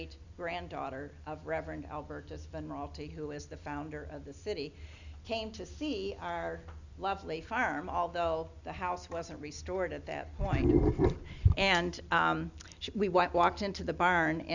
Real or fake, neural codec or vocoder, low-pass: real; none; 7.2 kHz